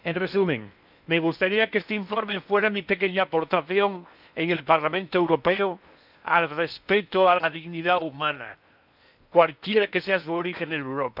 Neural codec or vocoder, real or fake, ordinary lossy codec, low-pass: codec, 16 kHz in and 24 kHz out, 0.8 kbps, FocalCodec, streaming, 65536 codes; fake; none; 5.4 kHz